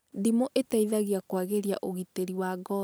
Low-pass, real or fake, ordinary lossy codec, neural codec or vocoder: none; real; none; none